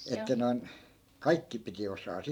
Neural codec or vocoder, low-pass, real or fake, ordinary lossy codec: vocoder, 44.1 kHz, 128 mel bands every 512 samples, BigVGAN v2; 19.8 kHz; fake; none